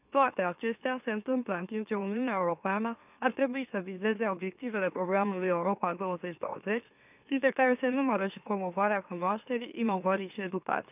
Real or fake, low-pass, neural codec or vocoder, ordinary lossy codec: fake; 3.6 kHz; autoencoder, 44.1 kHz, a latent of 192 numbers a frame, MeloTTS; none